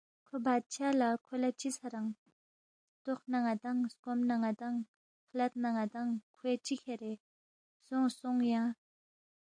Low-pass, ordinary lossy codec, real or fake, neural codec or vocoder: 9.9 kHz; AAC, 64 kbps; real; none